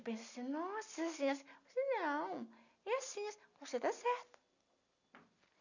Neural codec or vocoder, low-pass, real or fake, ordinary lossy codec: none; 7.2 kHz; real; none